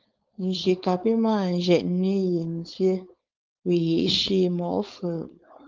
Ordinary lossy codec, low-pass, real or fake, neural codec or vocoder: Opus, 24 kbps; 7.2 kHz; fake; codec, 16 kHz, 4.8 kbps, FACodec